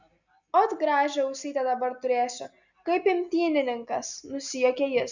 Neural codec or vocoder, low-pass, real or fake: none; 7.2 kHz; real